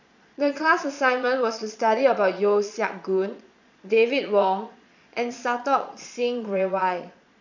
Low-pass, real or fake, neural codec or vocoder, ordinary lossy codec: 7.2 kHz; fake; vocoder, 22.05 kHz, 80 mel bands, Vocos; none